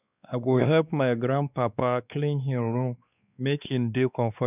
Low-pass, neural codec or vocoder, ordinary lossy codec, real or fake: 3.6 kHz; codec, 16 kHz, 2 kbps, X-Codec, WavLM features, trained on Multilingual LibriSpeech; none; fake